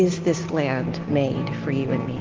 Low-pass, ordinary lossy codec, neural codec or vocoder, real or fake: 7.2 kHz; Opus, 16 kbps; none; real